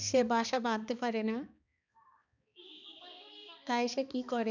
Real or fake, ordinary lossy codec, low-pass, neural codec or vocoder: fake; none; 7.2 kHz; codec, 16 kHz, 2 kbps, X-Codec, HuBERT features, trained on balanced general audio